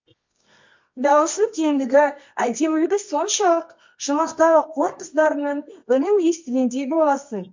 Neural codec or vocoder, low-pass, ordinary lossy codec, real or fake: codec, 24 kHz, 0.9 kbps, WavTokenizer, medium music audio release; 7.2 kHz; MP3, 48 kbps; fake